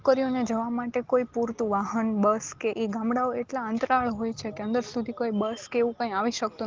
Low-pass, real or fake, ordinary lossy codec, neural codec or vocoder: 7.2 kHz; real; Opus, 16 kbps; none